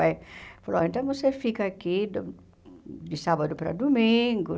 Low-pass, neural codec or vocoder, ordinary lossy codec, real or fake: none; none; none; real